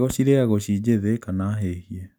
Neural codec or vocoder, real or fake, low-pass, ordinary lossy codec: none; real; none; none